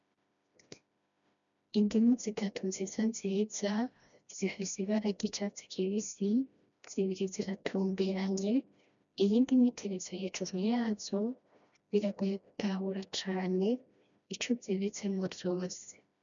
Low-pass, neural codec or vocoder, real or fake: 7.2 kHz; codec, 16 kHz, 1 kbps, FreqCodec, smaller model; fake